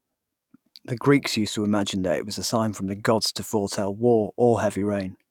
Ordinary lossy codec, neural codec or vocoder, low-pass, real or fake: none; codec, 44.1 kHz, 7.8 kbps, DAC; 19.8 kHz; fake